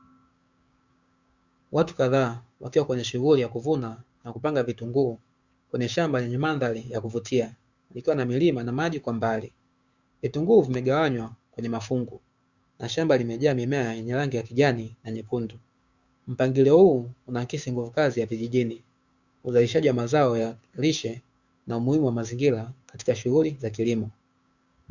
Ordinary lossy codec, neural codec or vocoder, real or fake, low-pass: Opus, 64 kbps; codec, 16 kHz, 6 kbps, DAC; fake; 7.2 kHz